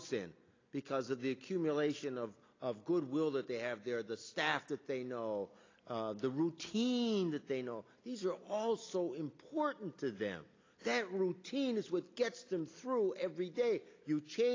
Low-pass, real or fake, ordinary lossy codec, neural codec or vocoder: 7.2 kHz; real; AAC, 32 kbps; none